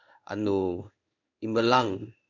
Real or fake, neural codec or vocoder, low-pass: fake; codec, 16 kHz in and 24 kHz out, 1 kbps, XY-Tokenizer; 7.2 kHz